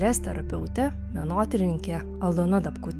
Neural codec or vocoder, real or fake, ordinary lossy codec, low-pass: autoencoder, 48 kHz, 128 numbers a frame, DAC-VAE, trained on Japanese speech; fake; Opus, 24 kbps; 14.4 kHz